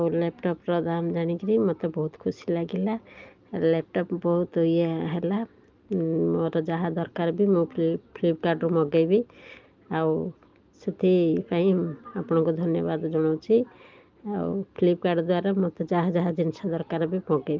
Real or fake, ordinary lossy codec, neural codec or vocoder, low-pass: real; Opus, 24 kbps; none; 7.2 kHz